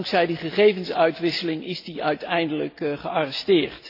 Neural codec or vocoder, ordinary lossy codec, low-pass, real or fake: none; AAC, 32 kbps; 5.4 kHz; real